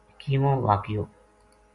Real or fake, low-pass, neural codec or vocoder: real; 10.8 kHz; none